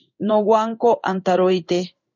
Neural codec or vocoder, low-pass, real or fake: codec, 16 kHz in and 24 kHz out, 1 kbps, XY-Tokenizer; 7.2 kHz; fake